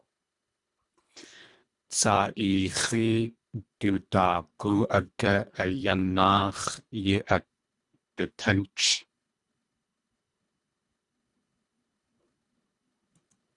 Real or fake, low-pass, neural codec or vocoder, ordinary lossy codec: fake; 10.8 kHz; codec, 24 kHz, 1.5 kbps, HILCodec; Opus, 64 kbps